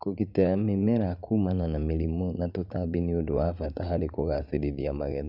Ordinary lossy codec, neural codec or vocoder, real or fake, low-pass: none; vocoder, 44.1 kHz, 80 mel bands, Vocos; fake; 5.4 kHz